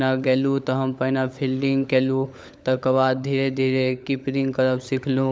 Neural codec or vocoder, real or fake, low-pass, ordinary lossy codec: codec, 16 kHz, 16 kbps, FunCodec, trained on LibriTTS, 50 frames a second; fake; none; none